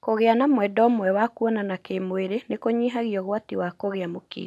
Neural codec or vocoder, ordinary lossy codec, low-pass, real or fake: none; none; none; real